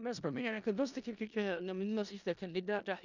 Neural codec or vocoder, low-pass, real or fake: codec, 16 kHz in and 24 kHz out, 0.4 kbps, LongCat-Audio-Codec, four codebook decoder; 7.2 kHz; fake